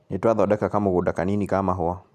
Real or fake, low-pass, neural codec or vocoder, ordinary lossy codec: real; 14.4 kHz; none; none